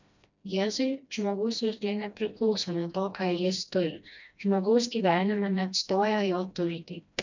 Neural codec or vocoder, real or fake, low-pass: codec, 16 kHz, 1 kbps, FreqCodec, smaller model; fake; 7.2 kHz